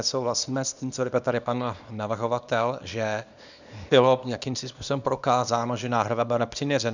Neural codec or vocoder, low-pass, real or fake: codec, 24 kHz, 0.9 kbps, WavTokenizer, small release; 7.2 kHz; fake